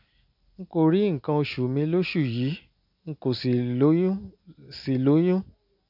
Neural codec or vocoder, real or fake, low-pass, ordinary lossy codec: none; real; 5.4 kHz; none